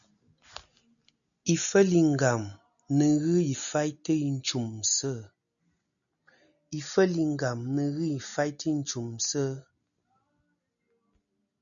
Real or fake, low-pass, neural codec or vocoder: real; 7.2 kHz; none